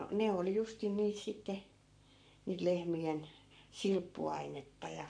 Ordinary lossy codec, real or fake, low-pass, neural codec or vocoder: none; fake; 9.9 kHz; codec, 44.1 kHz, 7.8 kbps, Pupu-Codec